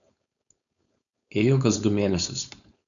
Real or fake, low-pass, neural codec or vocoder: fake; 7.2 kHz; codec, 16 kHz, 4.8 kbps, FACodec